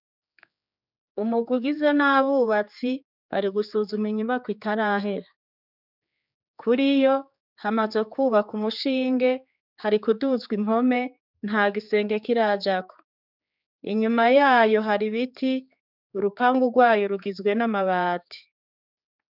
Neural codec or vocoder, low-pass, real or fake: codec, 16 kHz, 4 kbps, X-Codec, HuBERT features, trained on general audio; 5.4 kHz; fake